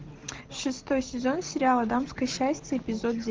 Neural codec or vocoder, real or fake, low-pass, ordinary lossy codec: none; real; 7.2 kHz; Opus, 16 kbps